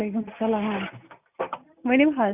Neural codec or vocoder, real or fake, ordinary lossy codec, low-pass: none; real; none; 3.6 kHz